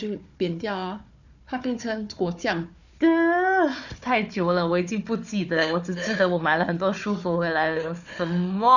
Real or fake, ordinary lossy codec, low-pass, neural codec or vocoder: fake; none; 7.2 kHz; codec, 16 kHz, 4 kbps, FunCodec, trained on Chinese and English, 50 frames a second